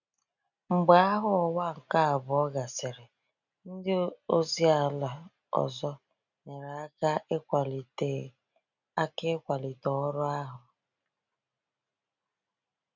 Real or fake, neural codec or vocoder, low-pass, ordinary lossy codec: real; none; 7.2 kHz; none